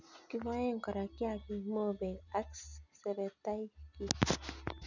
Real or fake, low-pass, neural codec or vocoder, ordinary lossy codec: real; 7.2 kHz; none; none